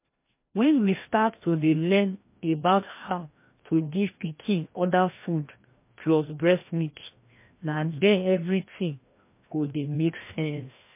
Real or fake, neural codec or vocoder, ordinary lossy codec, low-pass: fake; codec, 16 kHz, 1 kbps, FreqCodec, larger model; MP3, 24 kbps; 3.6 kHz